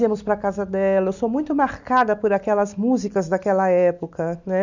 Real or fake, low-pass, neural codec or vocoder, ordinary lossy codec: real; 7.2 kHz; none; none